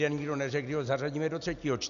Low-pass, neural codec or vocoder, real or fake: 7.2 kHz; none; real